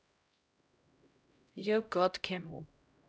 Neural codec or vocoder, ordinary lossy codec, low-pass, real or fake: codec, 16 kHz, 0.5 kbps, X-Codec, HuBERT features, trained on LibriSpeech; none; none; fake